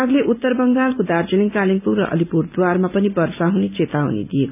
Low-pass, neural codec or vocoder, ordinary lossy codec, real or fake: 3.6 kHz; none; none; real